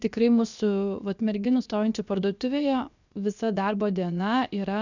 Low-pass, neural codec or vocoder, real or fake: 7.2 kHz; codec, 16 kHz, 0.7 kbps, FocalCodec; fake